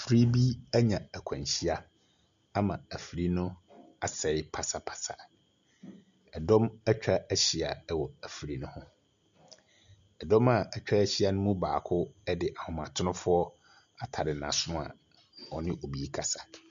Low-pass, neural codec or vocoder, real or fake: 7.2 kHz; none; real